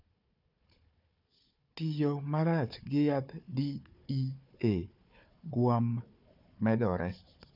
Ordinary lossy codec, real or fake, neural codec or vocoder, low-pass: none; fake; codec, 16 kHz, 4 kbps, FunCodec, trained on Chinese and English, 50 frames a second; 5.4 kHz